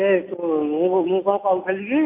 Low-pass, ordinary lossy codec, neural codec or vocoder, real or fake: 3.6 kHz; MP3, 24 kbps; none; real